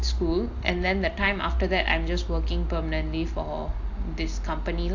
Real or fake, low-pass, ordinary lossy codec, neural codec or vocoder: real; 7.2 kHz; AAC, 48 kbps; none